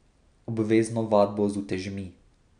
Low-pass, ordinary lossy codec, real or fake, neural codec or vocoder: 9.9 kHz; none; real; none